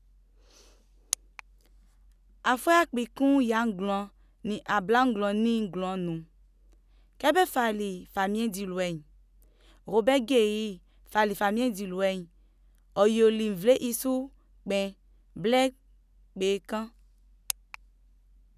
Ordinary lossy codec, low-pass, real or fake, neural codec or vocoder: none; 14.4 kHz; real; none